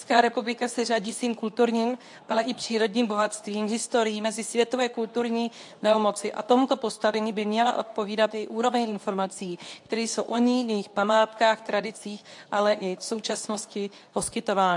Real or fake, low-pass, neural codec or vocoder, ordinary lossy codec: fake; 10.8 kHz; codec, 24 kHz, 0.9 kbps, WavTokenizer, medium speech release version 2; AAC, 64 kbps